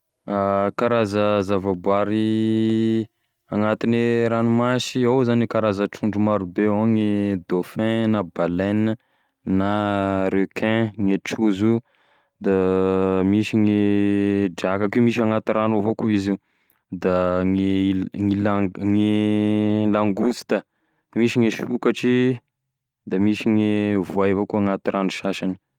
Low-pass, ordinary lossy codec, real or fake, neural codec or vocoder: 19.8 kHz; Opus, 32 kbps; real; none